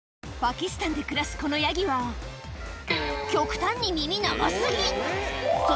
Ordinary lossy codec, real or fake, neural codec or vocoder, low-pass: none; real; none; none